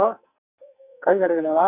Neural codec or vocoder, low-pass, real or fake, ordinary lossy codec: codec, 44.1 kHz, 2.6 kbps, SNAC; 3.6 kHz; fake; none